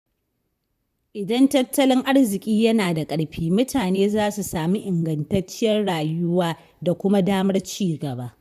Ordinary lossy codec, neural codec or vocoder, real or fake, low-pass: none; vocoder, 44.1 kHz, 128 mel bands, Pupu-Vocoder; fake; 14.4 kHz